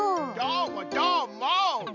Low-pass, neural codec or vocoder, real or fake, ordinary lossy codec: 7.2 kHz; none; real; none